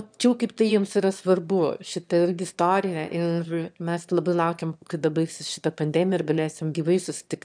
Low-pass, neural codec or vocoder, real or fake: 9.9 kHz; autoencoder, 22.05 kHz, a latent of 192 numbers a frame, VITS, trained on one speaker; fake